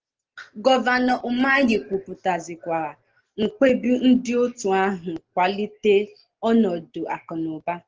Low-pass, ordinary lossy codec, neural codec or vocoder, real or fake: 7.2 kHz; Opus, 16 kbps; none; real